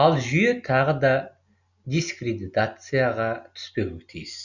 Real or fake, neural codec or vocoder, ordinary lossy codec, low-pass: real; none; none; 7.2 kHz